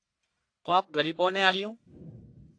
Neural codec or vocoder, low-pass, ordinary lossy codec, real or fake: codec, 44.1 kHz, 1.7 kbps, Pupu-Codec; 9.9 kHz; AAC, 48 kbps; fake